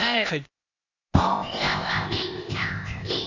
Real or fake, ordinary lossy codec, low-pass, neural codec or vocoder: fake; none; 7.2 kHz; codec, 16 kHz, 0.8 kbps, ZipCodec